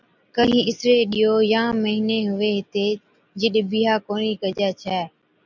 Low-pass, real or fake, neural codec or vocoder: 7.2 kHz; real; none